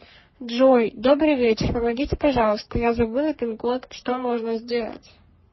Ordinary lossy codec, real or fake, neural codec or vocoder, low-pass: MP3, 24 kbps; fake; codec, 44.1 kHz, 3.4 kbps, Pupu-Codec; 7.2 kHz